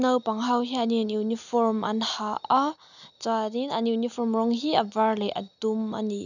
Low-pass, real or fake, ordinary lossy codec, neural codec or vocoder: 7.2 kHz; real; none; none